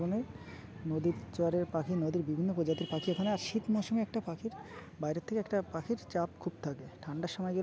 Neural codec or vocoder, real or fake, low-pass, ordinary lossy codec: none; real; none; none